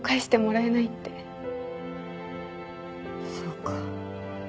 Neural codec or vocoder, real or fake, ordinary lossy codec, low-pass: none; real; none; none